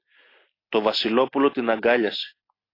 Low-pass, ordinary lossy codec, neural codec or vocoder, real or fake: 5.4 kHz; AAC, 24 kbps; none; real